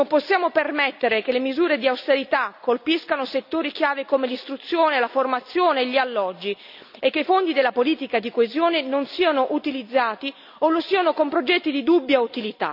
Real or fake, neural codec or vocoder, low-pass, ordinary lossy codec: real; none; 5.4 kHz; none